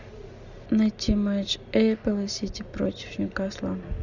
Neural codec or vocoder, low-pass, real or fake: none; 7.2 kHz; real